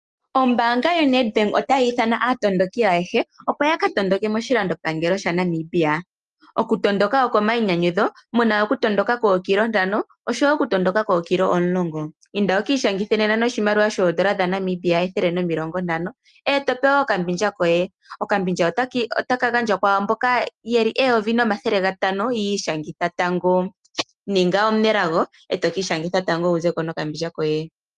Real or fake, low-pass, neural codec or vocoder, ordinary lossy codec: real; 10.8 kHz; none; Opus, 32 kbps